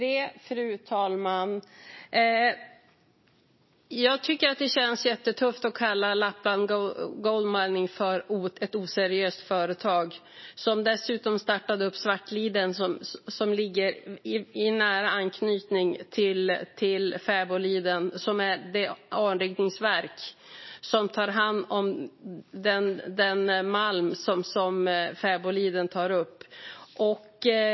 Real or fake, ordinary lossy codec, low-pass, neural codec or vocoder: real; MP3, 24 kbps; 7.2 kHz; none